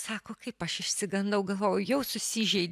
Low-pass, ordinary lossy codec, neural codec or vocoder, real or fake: 14.4 kHz; AAC, 96 kbps; none; real